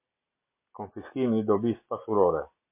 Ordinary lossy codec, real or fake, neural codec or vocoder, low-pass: MP3, 24 kbps; real; none; 3.6 kHz